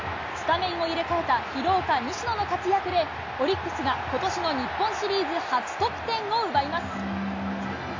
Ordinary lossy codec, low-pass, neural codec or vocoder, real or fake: AAC, 48 kbps; 7.2 kHz; none; real